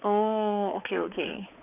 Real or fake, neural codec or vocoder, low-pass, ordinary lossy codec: fake; codec, 16 kHz, 4 kbps, X-Codec, HuBERT features, trained on balanced general audio; 3.6 kHz; none